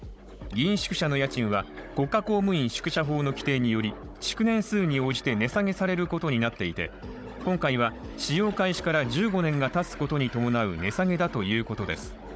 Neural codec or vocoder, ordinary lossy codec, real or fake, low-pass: codec, 16 kHz, 16 kbps, FunCodec, trained on Chinese and English, 50 frames a second; none; fake; none